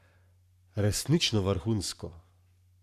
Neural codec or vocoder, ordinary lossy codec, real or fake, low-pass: codec, 44.1 kHz, 7.8 kbps, Pupu-Codec; none; fake; 14.4 kHz